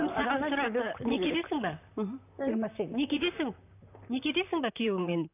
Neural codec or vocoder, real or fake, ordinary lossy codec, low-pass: vocoder, 44.1 kHz, 128 mel bands, Pupu-Vocoder; fake; none; 3.6 kHz